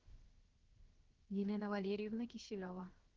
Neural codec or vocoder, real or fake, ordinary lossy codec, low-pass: codec, 16 kHz, 0.7 kbps, FocalCodec; fake; Opus, 16 kbps; 7.2 kHz